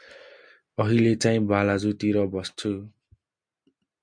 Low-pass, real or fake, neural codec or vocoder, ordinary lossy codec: 9.9 kHz; real; none; MP3, 64 kbps